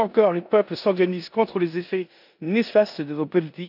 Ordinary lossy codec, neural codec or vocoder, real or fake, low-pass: none; codec, 16 kHz in and 24 kHz out, 0.9 kbps, LongCat-Audio-Codec, four codebook decoder; fake; 5.4 kHz